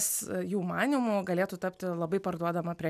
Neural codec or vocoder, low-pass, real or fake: none; 14.4 kHz; real